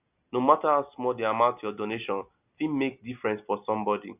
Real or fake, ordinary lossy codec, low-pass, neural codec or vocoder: real; none; 3.6 kHz; none